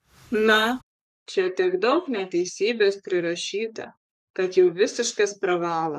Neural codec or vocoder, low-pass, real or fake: codec, 44.1 kHz, 3.4 kbps, Pupu-Codec; 14.4 kHz; fake